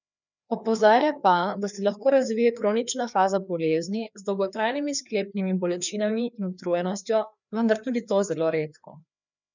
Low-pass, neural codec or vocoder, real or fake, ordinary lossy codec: 7.2 kHz; codec, 16 kHz, 2 kbps, FreqCodec, larger model; fake; none